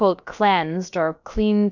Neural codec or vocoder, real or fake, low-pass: codec, 16 kHz, about 1 kbps, DyCAST, with the encoder's durations; fake; 7.2 kHz